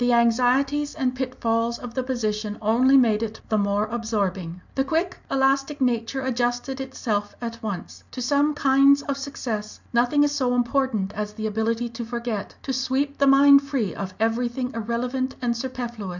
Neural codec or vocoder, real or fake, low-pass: none; real; 7.2 kHz